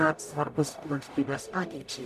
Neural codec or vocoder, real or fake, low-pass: codec, 44.1 kHz, 0.9 kbps, DAC; fake; 14.4 kHz